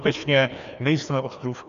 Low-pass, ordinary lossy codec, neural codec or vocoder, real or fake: 7.2 kHz; MP3, 96 kbps; codec, 16 kHz, 2 kbps, FreqCodec, larger model; fake